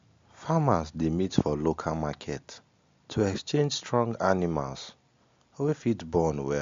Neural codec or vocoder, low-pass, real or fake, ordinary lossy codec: none; 7.2 kHz; real; MP3, 48 kbps